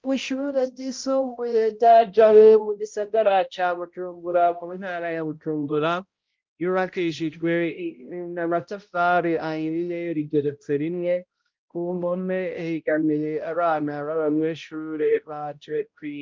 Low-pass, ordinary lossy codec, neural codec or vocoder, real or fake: 7.2 kHz; Opus, 32 kbps; codec, 16 kHz, 0.5 kbps, X-Codec, HuBERT features, trained on balanced general audio; fake